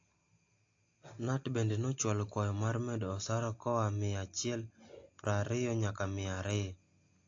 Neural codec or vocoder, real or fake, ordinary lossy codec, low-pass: none; real; AAC, 48 kbps; 7.2 kHz